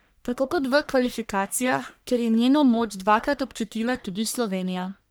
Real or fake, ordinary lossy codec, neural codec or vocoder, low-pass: fake; none; codec, 44.1 kHz, 1.7 kbps, Pupu-Codec; none